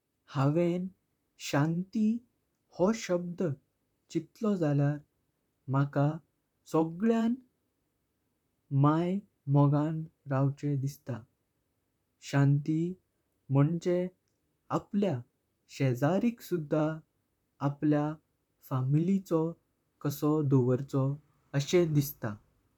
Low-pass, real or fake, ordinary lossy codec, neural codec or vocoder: 19.8 kHz; fake; none; vocoder, 44.1 kHz, 128 mel bands, Pupu-Vocoder